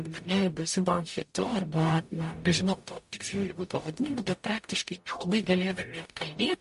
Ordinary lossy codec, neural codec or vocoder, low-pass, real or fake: MP3, 48 kbps; codec, 44.1 kHz, 0.9 kbps, DAC; 14.4 kHz; fake